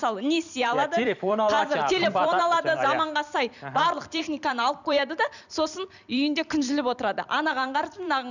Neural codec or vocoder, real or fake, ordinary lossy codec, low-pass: vocoder, 44.1 kHz, 128 mel bands every 256 samples, BigVGAN v2; fake; none; 7.2 kHz